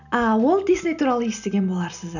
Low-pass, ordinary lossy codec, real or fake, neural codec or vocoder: 7.2 kHz; none; real; none